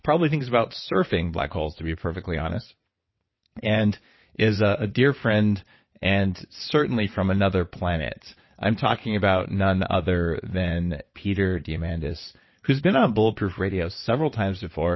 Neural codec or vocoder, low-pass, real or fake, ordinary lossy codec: vocoder, 22.05 kHz, 80 mel bands, WaveNeXt; 7.2 kHz; fake; MP3, 24 kbps